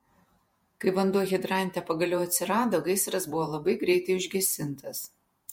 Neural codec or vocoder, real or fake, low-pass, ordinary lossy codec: vocoder, 48 kHz, 128 mel bands, Vocos; fake; 19.8 kHz; MP3, 64 kbps